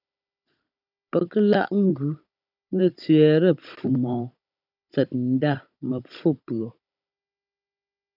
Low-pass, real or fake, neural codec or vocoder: 5.4 kHz; fake; codec, 16 kHz, 16 kbps, FunCodec, trained on Chinese and English, 50 frames a second